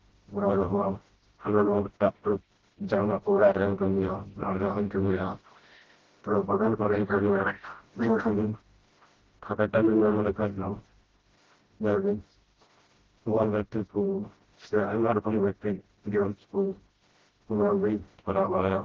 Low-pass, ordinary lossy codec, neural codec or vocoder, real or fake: 7.2 kHz; Opus, 16 kbps; codec, 16 kHz, 0.5 kbps, FreqCodec, smaller model; fake